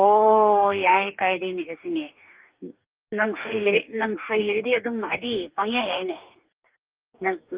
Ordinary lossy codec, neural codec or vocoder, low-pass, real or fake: Opus, 32 kbps; codec, 44.1 kHz, 2.6 kbps, DAC; 3.6 kHz; fake